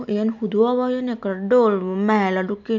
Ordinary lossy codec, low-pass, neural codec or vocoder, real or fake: none; 7.2 kHz; none; real